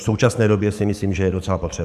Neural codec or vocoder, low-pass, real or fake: codec, 44.1 kHz, 7.8 kbps, DAC; 14.4 kHz; fake